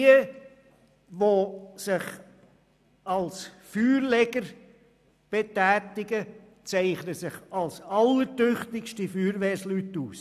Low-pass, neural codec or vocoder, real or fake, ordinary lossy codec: 14.4 kHz; none; real; none